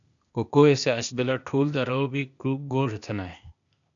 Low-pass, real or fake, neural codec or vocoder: 7.2 kHz; fake; codec, 16 kHz, 0.8 kbps, ZipCodec